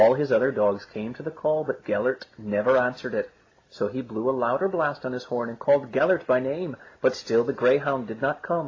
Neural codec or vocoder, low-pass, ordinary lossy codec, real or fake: none; 7.2 kHz; AAC, 32 kbps; real